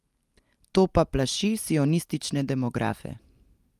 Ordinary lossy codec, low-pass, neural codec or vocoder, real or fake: Opus, 32 kbps; 14.4 kHz; none; real